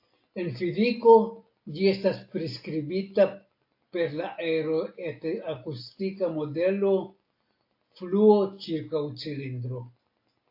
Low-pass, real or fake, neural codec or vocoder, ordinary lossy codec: 5.4 kHz; real; none; AAC, 32 kbps